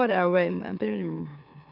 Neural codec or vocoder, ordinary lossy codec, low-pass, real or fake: autoencoder, 44.1 kHz, a latent of 192 numbers a frame, MeloTTS; none; 5.4 kHz; fake